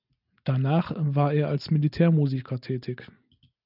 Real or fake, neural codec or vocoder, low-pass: real; none; 5.4 kHz